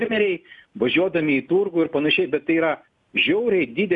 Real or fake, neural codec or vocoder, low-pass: real; none; 10.8 kHz